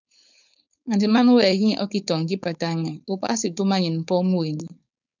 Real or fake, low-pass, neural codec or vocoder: fake; 7.2 kHz; codec, 16 kHz, 4.8 kbps, FACodec